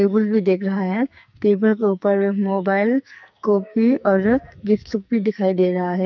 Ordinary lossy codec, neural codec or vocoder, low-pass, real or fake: none; codec, 44.1 kHz, 2.6 kbps, SNAC; 7.2 kHz; fake